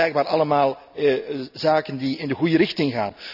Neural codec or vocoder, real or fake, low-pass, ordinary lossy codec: none; real; 5.4 kHz; none